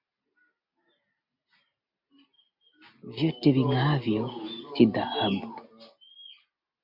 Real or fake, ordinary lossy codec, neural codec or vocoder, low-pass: real; MP3, 48 kbps; none; 5.4 kHz